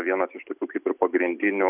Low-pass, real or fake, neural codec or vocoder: 3.6 kHz; real; none